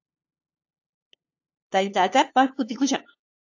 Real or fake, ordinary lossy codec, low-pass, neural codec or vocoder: fake; AAC, 48 kbps; 7.2 kHz; codec, 16 kHz, 2 kbps, FunCodec, trained on LibriTTS, 25 frames a second